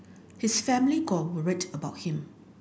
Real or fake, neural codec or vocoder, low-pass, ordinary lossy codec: real; none; none; none